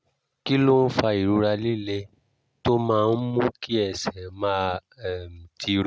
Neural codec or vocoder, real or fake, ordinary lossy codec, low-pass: none; real; none; none